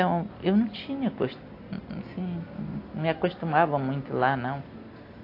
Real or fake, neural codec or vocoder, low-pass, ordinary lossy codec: real; none; 5.4 kHz; AAC, 32 kbps